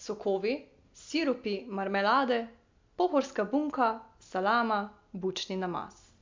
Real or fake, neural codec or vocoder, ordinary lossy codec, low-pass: real; none; MP3, 64 kbps; 7.2 kHz